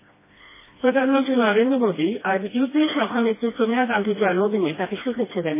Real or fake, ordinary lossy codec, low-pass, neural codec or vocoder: fake; MP3, 16 kbps; 3.6 kHz; codec, 16 kHz, 1 kbps, FreqCodec, smaller model